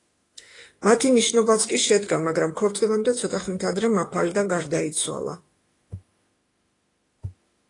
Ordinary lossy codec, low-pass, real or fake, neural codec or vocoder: AAC, 32 kbps; 10.8 kHz; fake; autoencoder, 48 kHz, 32 numbers a frame, DAC-VAE, trained on Japanese speech